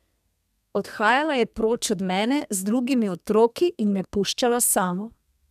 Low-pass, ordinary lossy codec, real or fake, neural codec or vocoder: 14.4 kHz; none; fake; codec, 32 kHz, 1.9 kbps, SNAC